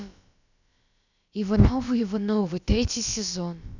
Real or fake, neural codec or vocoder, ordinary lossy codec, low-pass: fake; codec, 16 kHz, about 1 kbps, DyCAST, with the encoder's durations; none; 7.2 kHz